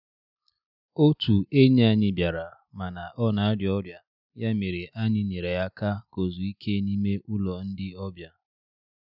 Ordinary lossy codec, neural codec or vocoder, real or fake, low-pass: none; none; real; 5.4 kHz